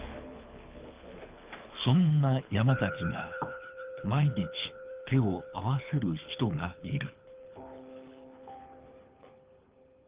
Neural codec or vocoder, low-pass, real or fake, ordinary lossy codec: codec, 24 kHz, 6 kbps, HILCodec; 3.6 kHz; fake; Opus, 32 kbps